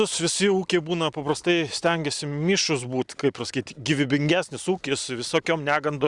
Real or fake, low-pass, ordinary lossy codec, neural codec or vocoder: real; 10.8 kHz; Opus, 64 kbps; none